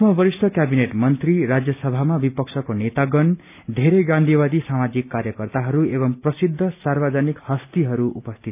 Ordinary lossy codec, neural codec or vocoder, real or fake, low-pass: none; none; real; 3.6 kHz